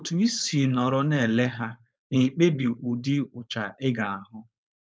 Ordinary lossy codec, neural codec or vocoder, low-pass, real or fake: none; codec, 16 kHz, 4.8 kbps, FACodec; none; fake